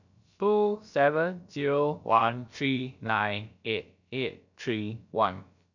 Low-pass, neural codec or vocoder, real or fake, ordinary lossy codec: 7.2 kHz; codec, 16 kHz, about 1 kbps, DyCAST, with the encoder's durations; fake; none